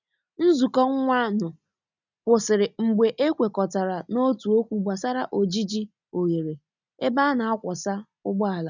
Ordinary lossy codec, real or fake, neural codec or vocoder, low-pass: none; real; none; 7.2 kHz